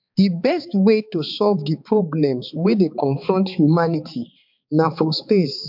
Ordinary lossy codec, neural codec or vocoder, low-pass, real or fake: MP3, 48 kbps; codec, 16 kHz, 4 kbps, X-Codec, HuBERT features, trained on balanced general audio; 5.4 kHz; fake